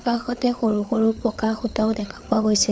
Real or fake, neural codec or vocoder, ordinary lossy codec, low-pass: fake; codec, 16 kHz, 8 kbps, FreqCodec, larger model; none; none